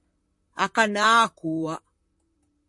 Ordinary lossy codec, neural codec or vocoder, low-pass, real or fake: MP3, 48 kbps; vocoder, 44.1 kHz, 128 mel bands, Pupu-Vocoder; 10.8 kHz; fake